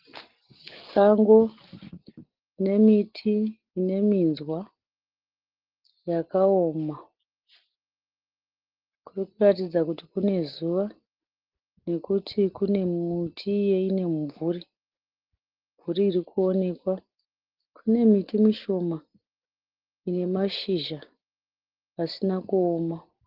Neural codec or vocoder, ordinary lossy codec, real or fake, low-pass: none; Opus, 16 kbps; real; 5.4 kHz